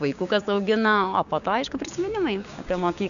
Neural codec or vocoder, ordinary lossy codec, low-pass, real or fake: codec, 16 kHz, 6 kbps, DAC; MP3, 96 kbps; 7.2 kHz; fake